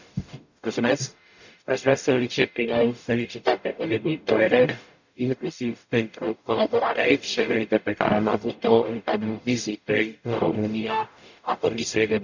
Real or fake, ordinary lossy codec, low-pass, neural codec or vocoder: fake; none; 7.2 kHz; codec, 44.1 kHz, 0.9 kbps, DAC